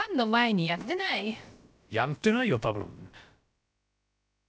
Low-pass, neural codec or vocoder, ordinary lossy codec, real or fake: none; codec, 16 kHz, about 1 kbps, DyCAST, with the encoder's durations; none; fake